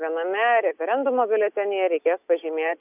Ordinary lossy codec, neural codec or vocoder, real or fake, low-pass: AAC, 32 kbps; none; real; 3.6 kHz